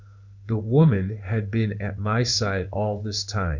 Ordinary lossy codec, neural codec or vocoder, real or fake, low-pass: Opus, 64 kbps; codec, 16 kHz in and 24 kHz out, 1 kbps, XY-Tokenizer; fake; 7.2 kHz